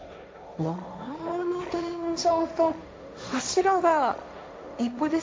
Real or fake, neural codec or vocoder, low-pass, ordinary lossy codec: fake; codec, 16 kHz, 1.1 kbps, Voila-Tokenizer; none; none